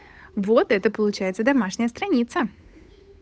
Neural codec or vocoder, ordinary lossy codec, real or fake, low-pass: codec, 16 kHz, 8 kbps, FunCodec, trained on Chinese and English, 25 frames a second; none; fake; none